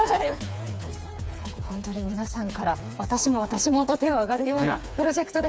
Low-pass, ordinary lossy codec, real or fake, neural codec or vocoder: none; none; fake; codec, 16 kHz, 4 kbps, FreqCodec, smaller model